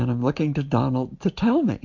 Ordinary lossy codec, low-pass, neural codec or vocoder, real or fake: MP3, 48 kbps; 7.2 kHz; none; real